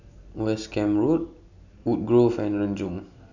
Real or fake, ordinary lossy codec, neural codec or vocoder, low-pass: real; none; none; 7.2 kHz